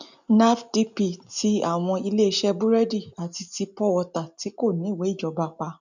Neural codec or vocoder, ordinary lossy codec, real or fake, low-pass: none; none; real; 7.2 kHz